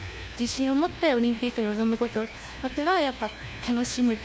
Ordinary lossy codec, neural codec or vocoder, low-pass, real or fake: none; codec, 16 kHz, 1 kbps, FunCodec, trained on LibriTTS, 50 frames a second; none; fake